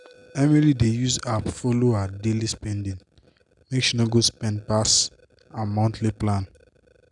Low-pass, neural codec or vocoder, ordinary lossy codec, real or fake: 10.8 kHz; none; none; real